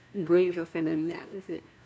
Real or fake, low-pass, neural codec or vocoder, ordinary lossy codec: fake; none; codec, 16 kHz, 1 kbps, FunCodec, trained on LibriTTS, 50 frames a second; none